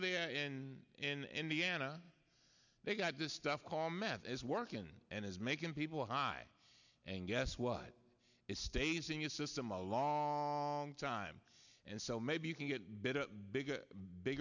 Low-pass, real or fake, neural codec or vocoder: 7.2 kHz; real; none